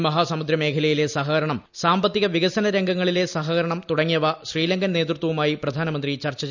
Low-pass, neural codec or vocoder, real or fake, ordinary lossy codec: 7.2 kHz; none; real; none